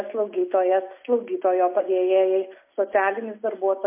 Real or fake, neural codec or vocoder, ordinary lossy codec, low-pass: real; none; MP3, 24 kbps; 3.6 kHz